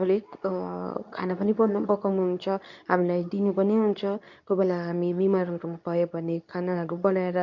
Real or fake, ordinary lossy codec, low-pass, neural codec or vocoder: fake; none; 7.2 kHz; codec, 24 kHz, 0.9 kbps, WavTokenizer, medium speech release version 2